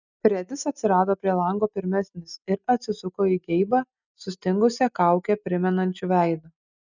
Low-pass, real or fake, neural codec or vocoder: 7.2 kHz; real; none